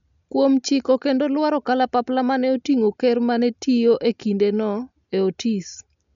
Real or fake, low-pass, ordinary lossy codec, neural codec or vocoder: real; 7.2 kHz; none; none